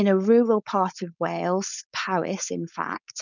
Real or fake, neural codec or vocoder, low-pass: fake; codec, 16 kHz, 4.8 kbps, FACodec; 7.2 kHz